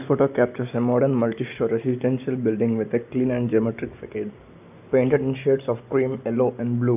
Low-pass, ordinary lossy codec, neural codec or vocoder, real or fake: 3.6 kHz; none; none; real